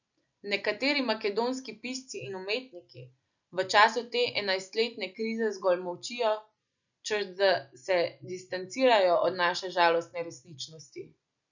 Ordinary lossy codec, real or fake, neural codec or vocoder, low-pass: none; real; none; 7.2 kHz